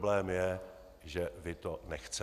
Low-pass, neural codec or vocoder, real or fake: 14.4 kHz; none; real